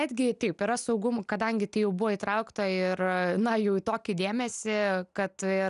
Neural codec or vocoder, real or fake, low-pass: none; real; 10.8 kHz